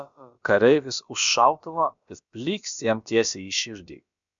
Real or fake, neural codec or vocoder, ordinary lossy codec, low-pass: fake; codec, 16 kHz, about 1 kbps, DyCAST, with the encoder's durations; MP3, 64 kbps; 7.2 kHz